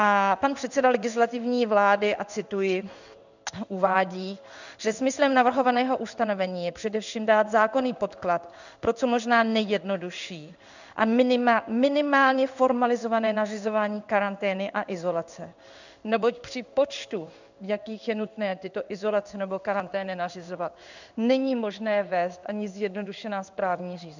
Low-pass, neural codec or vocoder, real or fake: 7.2 kHz; codec, 16 kHz in and 24 kHz out, 1 kbps, XY-Tokenizer; fake